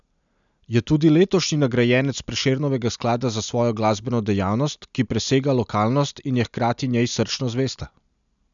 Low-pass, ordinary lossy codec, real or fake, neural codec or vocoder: 7.2 kHz; none; real; none